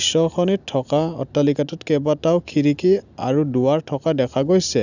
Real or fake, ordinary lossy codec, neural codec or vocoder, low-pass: real; none; none; 7.2 kHz